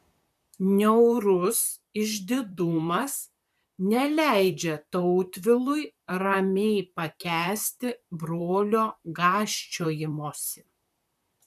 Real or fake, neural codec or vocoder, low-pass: fake; vocoder, 44.1 kHz, 128 mel bands, Pupu-Vocoder; 14.4 kHz